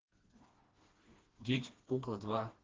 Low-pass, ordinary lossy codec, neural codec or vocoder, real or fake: 7.2 kHz; Opus, 16 kbps; codec, 16 kHz, 2 kbps, FreqCodec, smaller model; fake